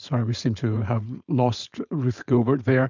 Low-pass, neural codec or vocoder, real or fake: 7.2 kHz; codec, 16 kHz, 4.8 kbps, FACodec; fake